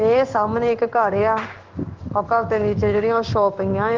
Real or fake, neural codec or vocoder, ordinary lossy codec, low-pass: fake; codec, 16 kHz in and 24 kHz out, 1 kbps, XY-Tokenizer; Opus, 32 kbps; 7.2 kHz